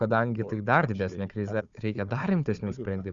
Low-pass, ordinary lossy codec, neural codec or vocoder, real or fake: 7.2 kHz; Opus, 64 kbps; codec, 16 kHz, 4 kbps, FunCodec, trained on Chinese and English, 50 frames a second; fake